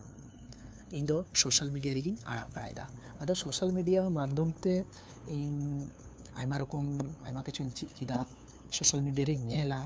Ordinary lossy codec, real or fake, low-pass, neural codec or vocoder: none; fake; none; codec, 16 kHz, 2 kbps, FunCodec, trained on LibriTTS, 25 frames a second